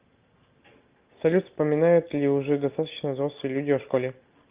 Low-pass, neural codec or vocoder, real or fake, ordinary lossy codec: 3.6 kHz; none; real; Opus, 24 kbps